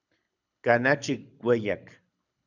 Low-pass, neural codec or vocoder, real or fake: 7.2 kHz; codec, 24 kHz, 6 kbps, HILCodec; fake